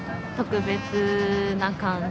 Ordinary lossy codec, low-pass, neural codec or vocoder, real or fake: none; none; none; real